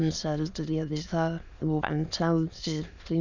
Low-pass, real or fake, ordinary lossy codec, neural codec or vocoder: 7.2 kHz; fake; none; autoencoder, 22.05 kHz, a latent of 192 numbers a frame, VITS, trained on many speakers